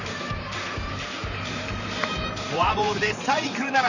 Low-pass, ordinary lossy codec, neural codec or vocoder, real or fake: 7.2 kHz; none; vocoder, 44.1 kHz, 128 mel bands, Pupu-Vocoder; fake